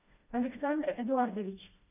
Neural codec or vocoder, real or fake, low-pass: codec, 16 kHz, 1 kbps, FreqCodec, smaller model; fake; 3.6 kHz